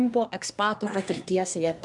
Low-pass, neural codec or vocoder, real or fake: 10.8 kHz; codec, 24 kHz, 1 kbps, SNAC; fake